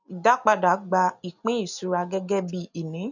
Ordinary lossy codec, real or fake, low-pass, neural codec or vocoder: none; real; 7.2 kHz; none